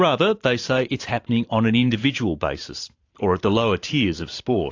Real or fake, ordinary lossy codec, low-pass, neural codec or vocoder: fake; AAC, 48 kbps; 7.2 kHz; vocoder, 44.1 kHz, 128 mel bands every 512 samples, BigVGAN v2